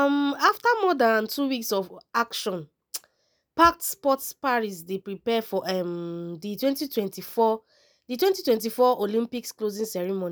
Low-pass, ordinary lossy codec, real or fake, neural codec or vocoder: none; none; real; none